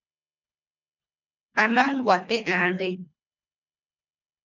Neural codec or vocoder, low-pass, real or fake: codec, 24 kHz, 1.5 kbps, HILCodec; 7.2 kHz; fake